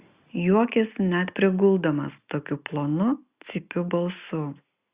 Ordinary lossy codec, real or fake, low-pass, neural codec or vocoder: Opus, 64 kbps; real; 3.6 kHz; none